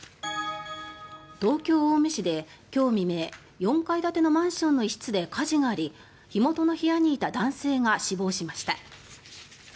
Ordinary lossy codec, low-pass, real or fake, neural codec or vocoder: none; none; real; none